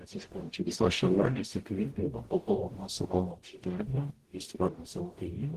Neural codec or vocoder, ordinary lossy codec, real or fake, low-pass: codec, 44.1 kHz, 0.9 kbps, DAC; Opus, 16 kbps; fake; 14.4 kHz